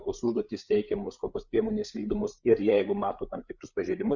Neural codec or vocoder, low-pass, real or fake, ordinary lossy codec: codec, 16 kHz, 8 kbps, FreqCodec, larger model; 7.2 kHz; fake; Opus, 64 kbps